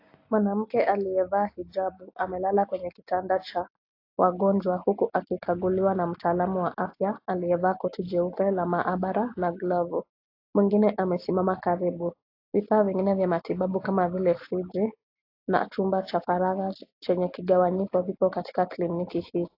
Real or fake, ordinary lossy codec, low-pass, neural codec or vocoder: real; AAC, 32 kbps; 5.4 kHz; none